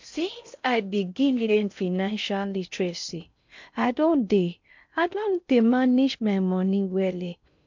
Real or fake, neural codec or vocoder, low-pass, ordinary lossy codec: fake; codec, 16 kHz in and 24 kHz out, 0.6 kbps, FocalCodec, streaming, 4096 codes; 7.2 kHz; MP3, 64 kbps